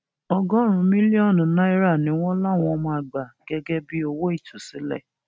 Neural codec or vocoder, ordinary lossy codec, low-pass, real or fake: none; none; none; real